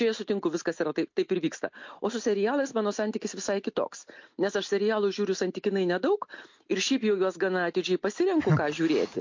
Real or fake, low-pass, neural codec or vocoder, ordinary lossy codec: real; 7.2 kHz; none; MP3, 48 kbps